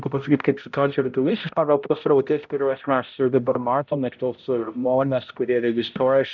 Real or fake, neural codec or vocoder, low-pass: fake; codec, 16 kHz, 0.5 kbps, X-Codec, HuBERT features, trained on balanced general audio; 7.2 kHz